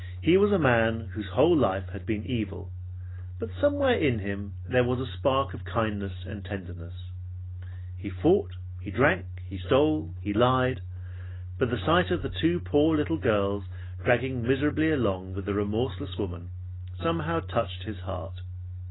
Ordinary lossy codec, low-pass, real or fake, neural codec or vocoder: AAC, 16 kbps; 7.2 kHz; real; none